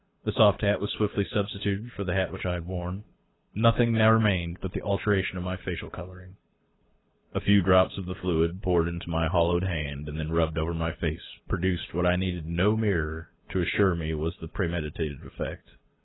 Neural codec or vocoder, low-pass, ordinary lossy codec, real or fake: codec, 24 kHz, 6 kbps, HILCodec; 7.2 kHz; AAC, 16 kbps; fake